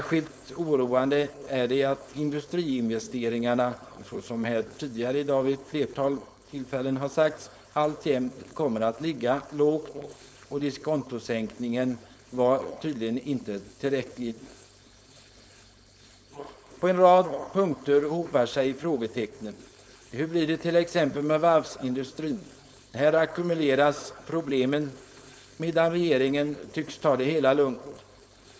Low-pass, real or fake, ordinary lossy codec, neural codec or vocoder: none; fake; none; codec, 16 kHz, 4.8 kbps, FACodec